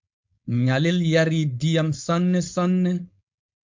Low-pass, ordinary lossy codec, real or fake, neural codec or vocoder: 7.2 kHz; MP3, 64 kbps; fake; codec, 16 kHz, 4.8 kbps, FACodec